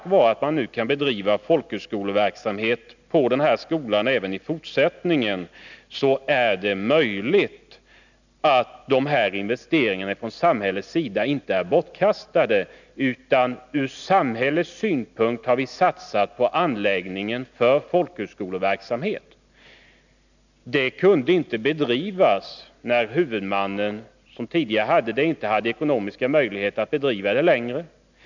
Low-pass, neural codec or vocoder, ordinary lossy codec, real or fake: 7.2 kHz; none; none; real